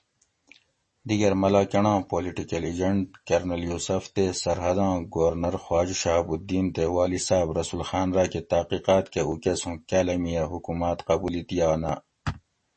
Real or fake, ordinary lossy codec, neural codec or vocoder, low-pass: real; MP3, 32 kbps; none; 10.8 kHz